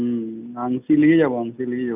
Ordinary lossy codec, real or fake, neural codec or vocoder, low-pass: none; real; none; 3.6 kHz